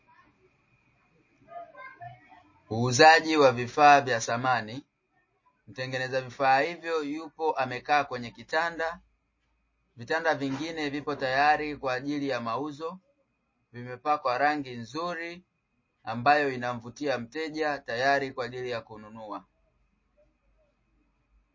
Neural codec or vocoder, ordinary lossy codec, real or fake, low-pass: none; MP3, 32 kbps; real; 7.2 kHz